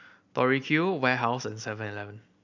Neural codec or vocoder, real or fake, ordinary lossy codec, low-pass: none; real; none; 7.2 kHz